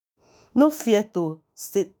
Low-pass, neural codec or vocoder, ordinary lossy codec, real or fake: none; autoencoder, 48 kHz, 32 numbers a frame, DAC-VAE, trained on Japanese speech; none; fake